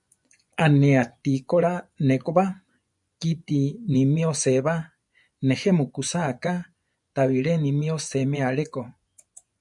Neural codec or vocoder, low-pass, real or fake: vocoder, 24 kHz, 100 mel bands, Vocos; 10.8 kHz; fake